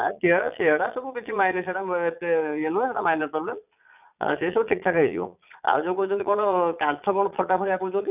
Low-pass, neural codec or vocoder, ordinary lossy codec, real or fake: 3.6 kHz; vocoder, 22.05 kHz, 80 mel bands, Vocos; none; fake